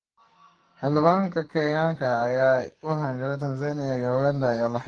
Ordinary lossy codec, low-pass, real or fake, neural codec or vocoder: Opus, 24 kbps; 9.9 kHz; fake; codec, 44.1 kHz, 2.6 kbps, SNAC